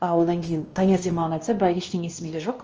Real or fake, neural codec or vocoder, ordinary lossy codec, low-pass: fake; codec, 16 kHz, 1 kbps, X-Codec, WavLM features, trained on Multilingual LibriSpeech; Opus, 24 kbps; 7.2 kHz